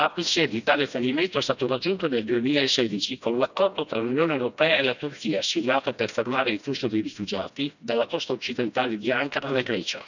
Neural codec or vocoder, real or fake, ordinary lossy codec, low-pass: codec, 16 kHz, 1 kbps, FreqCodec, smaller model; fake; none; 7.2 kHz